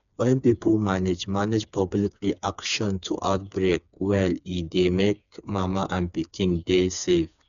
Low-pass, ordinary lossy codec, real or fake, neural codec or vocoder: 7.2 kHz; none; fake; codec, 16 kHz, 4 kbps, FreqCodec, smaller model